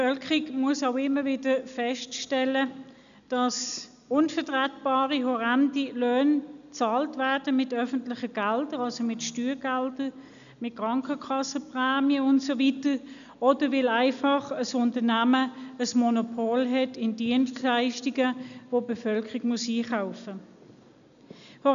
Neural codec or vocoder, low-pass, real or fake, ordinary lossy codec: none; 7.2 kHz; real; MP3, 96 kbps